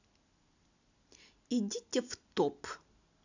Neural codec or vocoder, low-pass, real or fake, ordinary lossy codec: none; 7.2 kHz; real; none